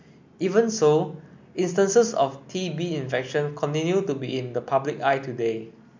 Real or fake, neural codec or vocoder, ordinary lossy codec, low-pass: real; none; MP3, 64 kbps; 7.2 kHz